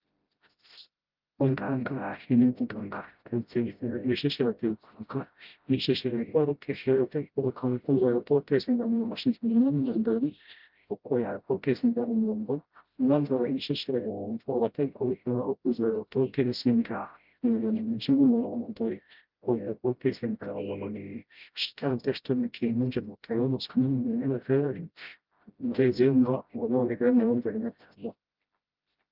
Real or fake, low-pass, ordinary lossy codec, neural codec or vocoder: fake; 5.4 kHz; Opus, 24 kbps; codec, 16 kHz, 0.5 kbps, FreqCodec, smaller model